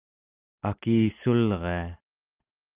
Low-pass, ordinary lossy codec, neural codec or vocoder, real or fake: 3.6 kHz; Opus, 24 kbps; none; real